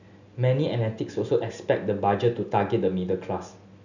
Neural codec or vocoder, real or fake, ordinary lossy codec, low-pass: none; real; none; 7.2 kHz